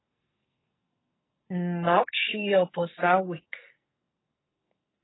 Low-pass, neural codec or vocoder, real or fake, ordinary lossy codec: 7.2 kHz; codec, 44.1 kHz, 2.6 kbps, SNAC; fake; AAC, 16 kbps